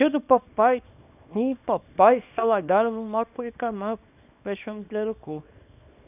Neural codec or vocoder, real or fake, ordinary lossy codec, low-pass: codec, 24 kHz, 0.9 kbps, WavTokenizer, small release; fake; none; 3.6 kHz